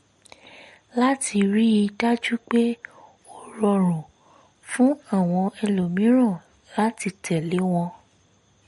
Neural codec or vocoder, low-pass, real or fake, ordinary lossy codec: none; 19.8 kHz; real; MP3, 48 kbps